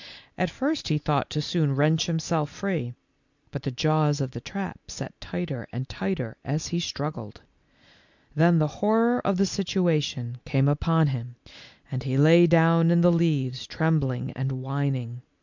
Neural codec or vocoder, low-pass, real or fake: none; 7.2 kHz; real